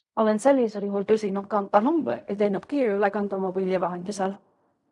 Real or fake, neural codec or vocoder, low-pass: fake; codec, 16 kHz in and 24 kHz out, 0.4 kbps, LongCat-Audio-Codec, fine tuned four codebook decoder; 10.8 kHz